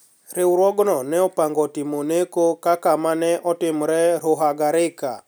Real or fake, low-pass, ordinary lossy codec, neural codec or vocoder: real; none; none; none